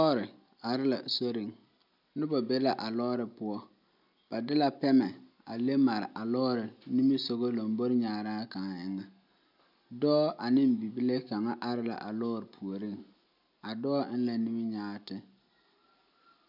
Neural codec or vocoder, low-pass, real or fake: none; 5.4 kHz; real